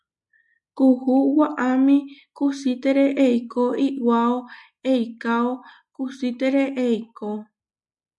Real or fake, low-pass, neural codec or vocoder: real; 10.8 kHz; none